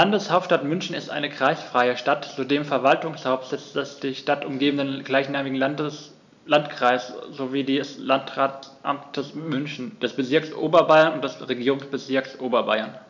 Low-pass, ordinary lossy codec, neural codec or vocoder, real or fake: 7.2 kHz; none; none; real